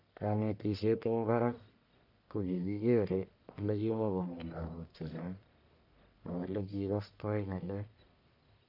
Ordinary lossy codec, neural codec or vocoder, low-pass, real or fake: none; codec, 44.1 kHz, 1.7 kbps, Pupu-Codec; 5.4 kHz; fake